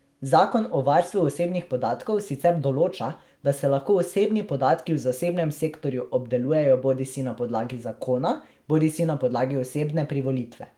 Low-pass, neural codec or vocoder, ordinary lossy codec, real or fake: 19.8 kHz; autoencoder, 48 kHz, 128 numbers a frame, DAC-VAE, trained on Japanese speech; Opus, 24 kbps; fake